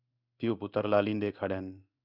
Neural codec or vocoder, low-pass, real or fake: codec, 16 kHz in and 24 kHz out, 1 kbps, XY-Tokenizer; 5.4 kHz; fake